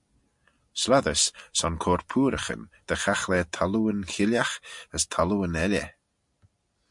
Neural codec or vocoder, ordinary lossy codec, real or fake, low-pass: none; MP3, 64 kbps; real; 10.8 kHz